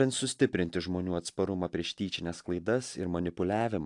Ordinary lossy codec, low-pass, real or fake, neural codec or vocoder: AAC, 64 kbps; 10.8 kHz; real; none